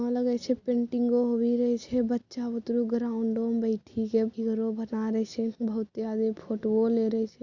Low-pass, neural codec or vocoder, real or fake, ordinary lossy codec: 7.2 kHz; none; real; Opus, 64 kbps